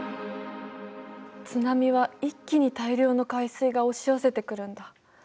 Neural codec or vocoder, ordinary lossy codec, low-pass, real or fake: none; none; none; real